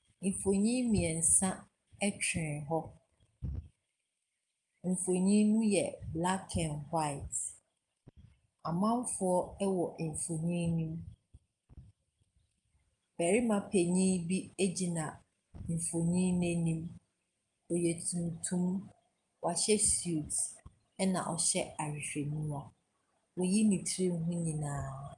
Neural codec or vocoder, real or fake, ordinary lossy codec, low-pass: none; real; Opus, 32 kbps; 10.8 kHz